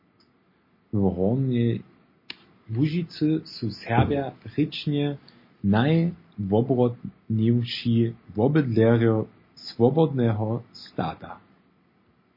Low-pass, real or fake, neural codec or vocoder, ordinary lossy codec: 5.4 kHz; real; none; MP3, 24 kbps